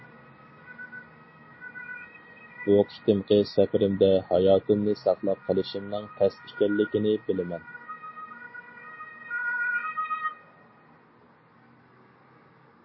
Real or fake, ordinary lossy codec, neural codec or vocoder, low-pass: real; MP3, 24 kbps; none; 7.2 kHz